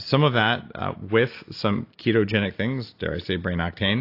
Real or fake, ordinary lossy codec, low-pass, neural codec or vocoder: real; AAC, 32 kbps; 5.4 kHz; none